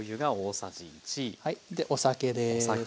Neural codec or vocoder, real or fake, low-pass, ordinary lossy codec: none; real; none; none